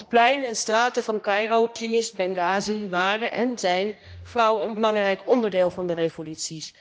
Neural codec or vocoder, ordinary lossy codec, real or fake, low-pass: codec, 16 kHz, 1 kbps, X-Codec, HuBERT features, trained on general audio; none; fake; none